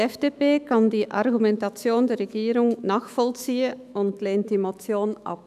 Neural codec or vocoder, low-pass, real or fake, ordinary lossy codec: autoencoder, 48 kHz, 128 numbers a frame, DAC-VAE, trained on Japanese speech; 14.4 kHz; fake; none